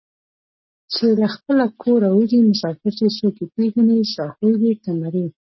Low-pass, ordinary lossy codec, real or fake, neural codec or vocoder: 7.2 kHz; MP3, 24 kbps; real; none